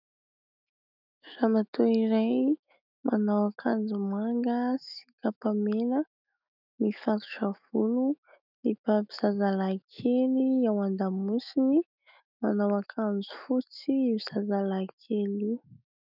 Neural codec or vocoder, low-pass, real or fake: autoencoder, 48 kHz, 128 numbers a frame, DAC-VAE, trained on Japanese speech; 5.4 kHz; fake